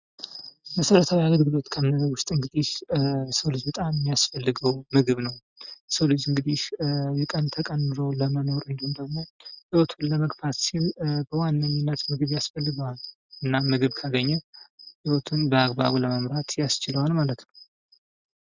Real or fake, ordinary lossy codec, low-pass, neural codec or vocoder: real; Opus, 64 kbps; 7.2 kHz; none